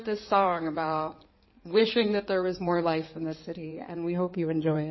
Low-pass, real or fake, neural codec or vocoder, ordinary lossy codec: 7.2 kHz; fake; codec, 16 kHz in and 24 kHz out, 2.2 kbps, FireRedTTS-2 codec; MP3, 24 kbps